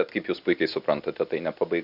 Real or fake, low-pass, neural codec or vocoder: real; 5.4 kHz; none